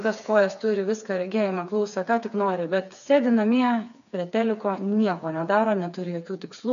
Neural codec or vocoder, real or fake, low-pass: codec, 16 kHz, 4 kbps, FreqCodec, smaller model; fake; 7.2 kHz